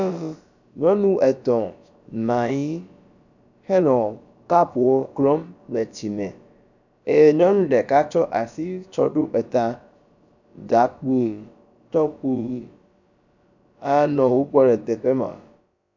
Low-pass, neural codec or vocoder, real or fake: 7.2 kHz; codec, 16 kHz, about 1 kbps, DyCAST, with the encoder's durations; fake